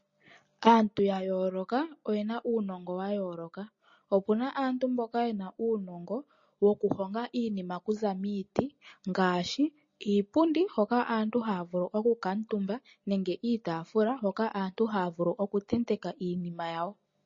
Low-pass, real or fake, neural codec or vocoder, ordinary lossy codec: 7.2 kHz; real; none; MP3, 32 kbps